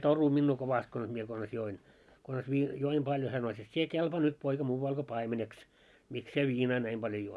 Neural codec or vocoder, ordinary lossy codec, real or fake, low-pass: none; none; real; none